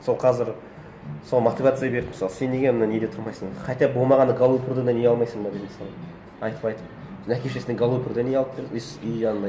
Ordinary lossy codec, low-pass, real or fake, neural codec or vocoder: none; none; real; none